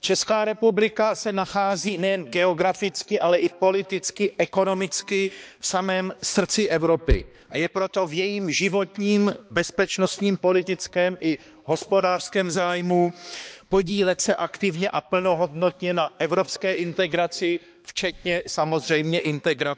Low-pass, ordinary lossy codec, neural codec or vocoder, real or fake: none; none; codec, 16 kHz, 2 kbps, X-Codec, HuBERT features, trained on balanced general audio; fake